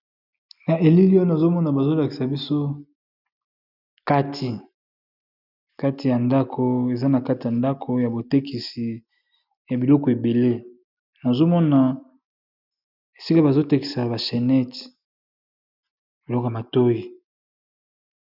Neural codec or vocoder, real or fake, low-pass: none; real; 5.4 kHz